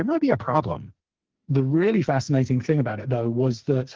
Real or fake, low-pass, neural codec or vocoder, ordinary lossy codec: fake; 7.2 kHz; codec, 44.1 kHz, 2.6 kbps, SNAC; Opus, 16 kbps